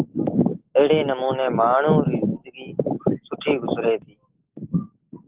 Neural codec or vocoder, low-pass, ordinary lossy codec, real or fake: none; 3.6 kHz; Opus, 16 kbps; real